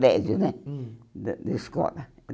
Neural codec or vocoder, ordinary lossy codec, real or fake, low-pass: none; none; real; none